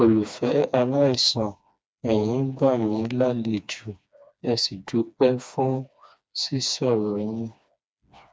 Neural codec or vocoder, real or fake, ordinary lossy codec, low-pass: codec, 16 kHz, 2 kbps, FreqCodec, smaller model; fake; none; none